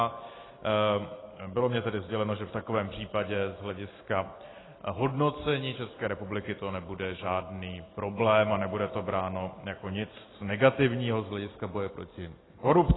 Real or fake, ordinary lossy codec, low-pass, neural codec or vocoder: real; AAC, 16 kbps; 7.2 kHz; none